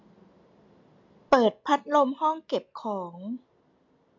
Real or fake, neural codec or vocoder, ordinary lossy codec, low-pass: real; none; MP3, 64 kbps; 7.2 kHz